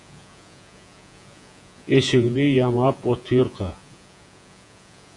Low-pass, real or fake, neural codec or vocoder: 10.8 kHz; fake; vocoder, 48 kHz, 128 mel bands, Vocos